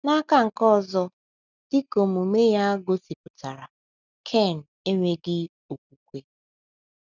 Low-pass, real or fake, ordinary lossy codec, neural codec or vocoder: 7.2 kHz; real; none; none